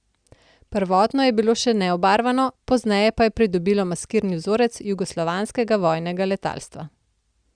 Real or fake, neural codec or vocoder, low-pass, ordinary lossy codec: real; none; 9.9 kHz; Opus, 64 kbps